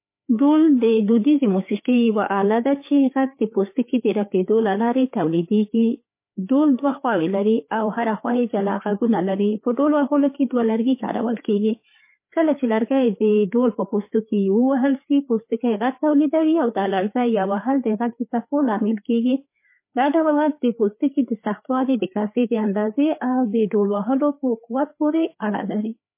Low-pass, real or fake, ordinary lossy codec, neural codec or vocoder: 3.6 kHz; fake; MP3, 24 kbps; codec, 16 kHz, 4 kbps, FreqCodec, larger model